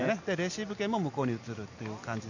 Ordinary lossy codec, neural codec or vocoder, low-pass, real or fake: none; none; 7.2 kHz; real